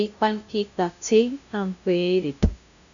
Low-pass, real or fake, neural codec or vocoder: 7.2 kHz; fake; codec, 16 kHz, 0.5 kbps, FunCodec, trained on LibriTTS, 25 frames a second